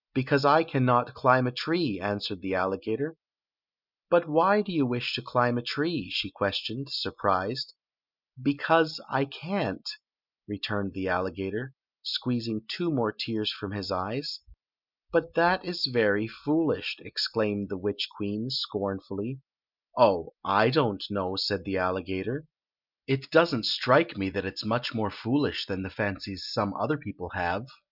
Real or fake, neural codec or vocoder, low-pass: real; none; 5.4 kHz